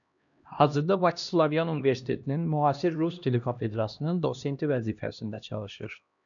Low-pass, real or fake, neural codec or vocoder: 7.2 kHz; fake; codec, 16 kHz, 1 kbps, X-Codec, HuBERT features, trained on LibriSpeech